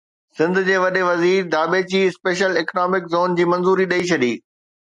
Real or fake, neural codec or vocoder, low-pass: real; none; 10.8 kHz